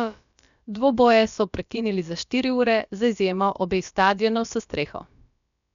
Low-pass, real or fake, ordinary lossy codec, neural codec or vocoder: 7.2 kHz; fake; none; codec, 16 kHz, about 1 kbps, DyCAST, with the encoder's durations